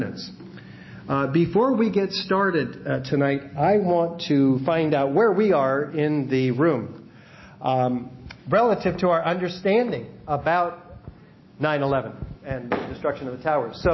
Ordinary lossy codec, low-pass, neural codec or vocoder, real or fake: MP3, 24 kbps; 7.2 kHz; none; real